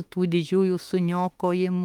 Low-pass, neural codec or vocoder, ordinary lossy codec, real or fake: 19.8 kHz; autoencoder, 48 kHz, 32 numbers a frame, DAC-VAE, trained on Japanese speech; Opus, 32 kbps; fake